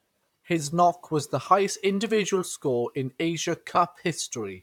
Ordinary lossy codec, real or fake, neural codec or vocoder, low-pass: none; fake; vocoder, 44.1 kHz, 128 mel bands, Pupu-Vocoder; 19.8 kHz